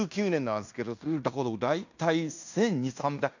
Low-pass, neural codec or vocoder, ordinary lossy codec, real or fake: 7.2 kHz; codec, 16 kHz in and 24 kHz out, 0.9 kbps, LongCat-Audio-Codec, fine tuned four codebook decoder; none; fake